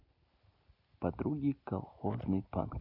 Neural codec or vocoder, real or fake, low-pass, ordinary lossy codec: none; real; 5.4 kHz; none